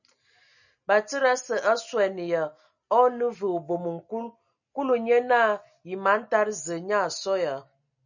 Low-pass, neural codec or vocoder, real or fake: 7.2 kHz; none; real